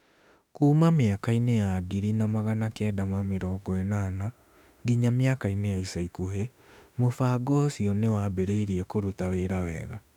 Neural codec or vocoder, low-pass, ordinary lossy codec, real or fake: autoencoder, 48 kHz, 32 numbers a frame, DAC-VAE, trained on Japanese speech; 19.8 kHz; none; fake